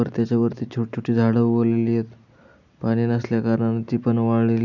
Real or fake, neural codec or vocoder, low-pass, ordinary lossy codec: real; none; 7.2 kHz; none